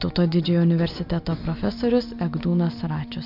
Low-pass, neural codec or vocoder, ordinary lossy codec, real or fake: 5.4 kHz; none; MP3, 48 kbps; real